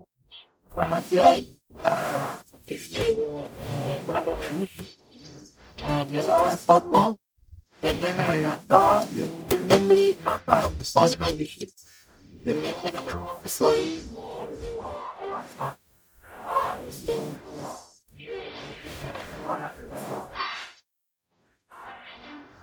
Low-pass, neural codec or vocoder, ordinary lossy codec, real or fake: none; codec, 44.1 kHz, 0.9 kbps, DAC; none; fake